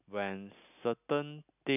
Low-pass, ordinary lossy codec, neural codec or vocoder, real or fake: 3.6 kHz; none; none; real